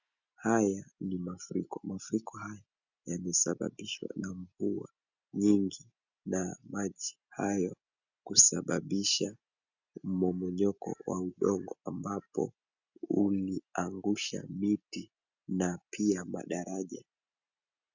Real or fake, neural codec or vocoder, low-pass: real; none; 7.2 kHz